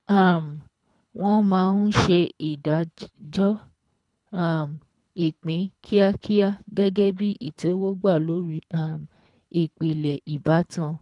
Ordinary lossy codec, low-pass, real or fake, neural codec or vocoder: none; 10.8 kHz; fake; codec, 24 kHz, 3 kbps, HILCodec